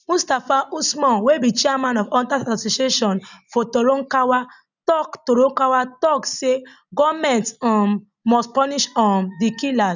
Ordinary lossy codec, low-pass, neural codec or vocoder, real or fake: none; 7.2 kHz; none; real